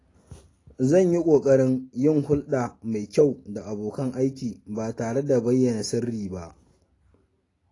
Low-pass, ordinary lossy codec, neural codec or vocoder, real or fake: 10.8 kHz; AAC, 32 kbps; none; real